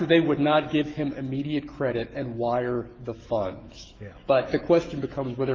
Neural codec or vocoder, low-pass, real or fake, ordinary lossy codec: autoencoder, 48 kHz, 128 numbers a frame, DAC-VAE, trained on Japanese speech; 7.2 kHz; fake; Opus, 32 kbps